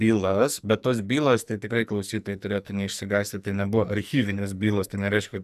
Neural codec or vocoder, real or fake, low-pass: codec, 44.1 kHz, 2.6 kbps, SNAC; fake; 14.4 kHz